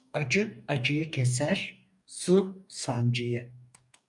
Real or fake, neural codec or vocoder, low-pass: fake; codec, 44.1 kHz, 2.6 kbps, DAC; 10.8 kHz